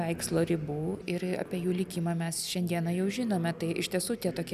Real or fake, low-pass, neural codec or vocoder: real; 14.4 kHz; none